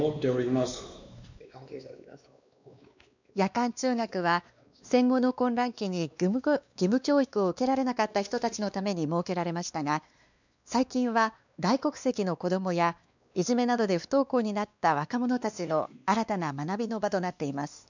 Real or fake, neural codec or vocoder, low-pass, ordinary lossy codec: fake; codec, 16 kHz, 2 kbps, X-Codec, WavLM features, trained on Multilingual LibriSpeech; 7.2 kHz; none